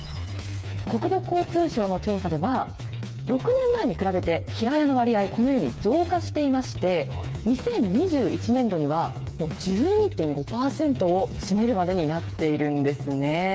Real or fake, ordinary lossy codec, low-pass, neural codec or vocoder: fake; none; none; codec, 16 kHz, 4 kbps, FreqCodec, smaller model